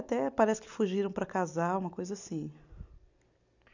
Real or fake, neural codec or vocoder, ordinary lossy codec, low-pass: real; none; none; 7.2 kHz